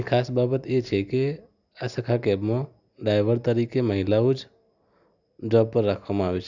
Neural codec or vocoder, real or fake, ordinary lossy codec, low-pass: none; real; none; 7.2 kHz